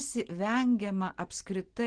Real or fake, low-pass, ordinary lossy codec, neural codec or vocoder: real; 9.9 kHz; Opus, 16 kbps; none